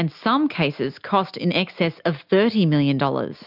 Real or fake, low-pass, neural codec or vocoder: real; 5.4 kHz; none